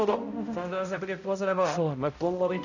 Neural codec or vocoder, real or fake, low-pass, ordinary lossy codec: codec, 16 kHz, 0.5 kbps, X-Codec, HuBERT features, trained on balanced general audio; fake; 7.2 kHz; none